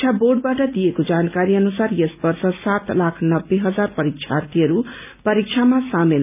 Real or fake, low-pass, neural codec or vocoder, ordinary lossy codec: real; 3.6 kHz; none; none